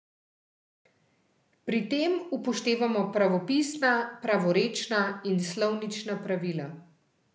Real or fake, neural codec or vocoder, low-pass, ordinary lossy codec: real; none; none; none